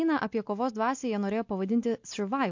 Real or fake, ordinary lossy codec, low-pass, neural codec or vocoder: real; MP3, 48 kbps; 7.2 kHz; none